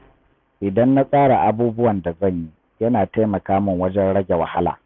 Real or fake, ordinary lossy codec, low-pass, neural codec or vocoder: real; none; 7.2 kHz; none